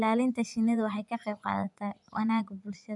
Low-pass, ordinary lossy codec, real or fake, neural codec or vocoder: 10.8 kHz; none; fake; vocoder, 24 kHz, 100 mel bands, Vocos